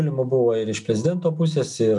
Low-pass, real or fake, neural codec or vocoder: 10.8 kHz; real; none